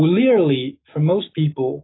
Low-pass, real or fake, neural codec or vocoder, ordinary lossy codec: 7.2 kHz; fake; autoencoder, 48 kHz, 128 numbers a frame, DAC-VAE, trained on Japanese speech; AAC, 16 kbps